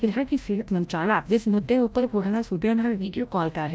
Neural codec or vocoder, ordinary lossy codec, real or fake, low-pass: codec, 16 kHz, 0.5 kbps, FreqCodec, larger model; none; fake; none